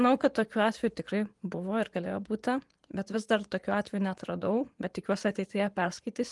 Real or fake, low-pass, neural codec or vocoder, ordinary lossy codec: fake; 10.8 kHz; vocoder, 24 kHz, 100 mel bands, Vocos; Opus, 24 kbps